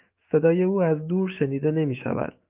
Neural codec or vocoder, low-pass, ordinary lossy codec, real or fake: autoencoder, 48 kHz, 128 numbers a frame, DAC-VAE, trained on Japanese speech; 3.6 kHz; Opus, 32 kbps; fake